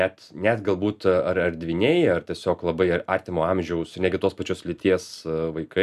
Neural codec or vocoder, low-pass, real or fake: none; 14.4 kHz; real